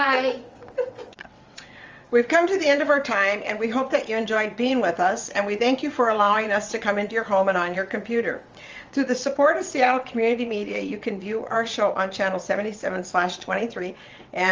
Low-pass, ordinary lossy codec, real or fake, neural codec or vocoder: 7.2 kHz; Opus, 32 kbps; fake; vocoder, 22.05 kHz, 80 mel bands, WaveNeXt